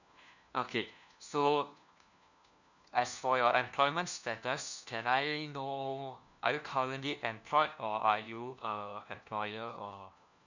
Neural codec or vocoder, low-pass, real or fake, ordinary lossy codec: codec, 16 kHz, 1 kbps, FunCodec, trained on LibriTTS, 50 frames a second; 7.2 kHz; fake; none